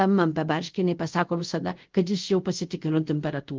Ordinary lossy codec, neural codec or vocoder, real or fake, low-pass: Opus, 32 kbps; codec, 24 kHz, 0.5 kbps, DualCodec; fake; 7.2 kHz